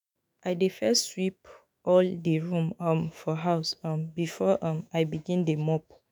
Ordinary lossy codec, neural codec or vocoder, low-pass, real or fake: none; autoencoder, 48 kHz, 128 numbers a frame, DAC-VAE, trained on Japanese speech; none; fake